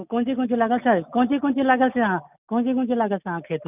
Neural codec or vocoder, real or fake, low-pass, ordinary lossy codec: none; real; 3.6 kHz; none